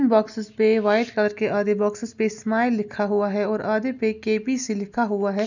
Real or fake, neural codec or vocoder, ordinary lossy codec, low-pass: real; none; none; 7.2 kHz